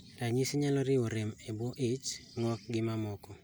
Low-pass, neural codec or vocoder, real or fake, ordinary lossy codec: none; none; real; none